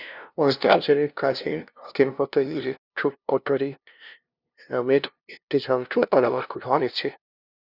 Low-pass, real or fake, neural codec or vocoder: 5.4 kHz; fake; codec, 16 kHz, 0.5 kbps, FunCodec, trained on LibriTTS, 25 frames a second